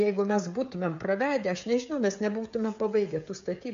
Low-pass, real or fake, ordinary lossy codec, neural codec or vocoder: 7.2 kHz; fake; MP3, 48 kbps; codec, 16 kHz, 16 kbps, FreqCodec, smaller model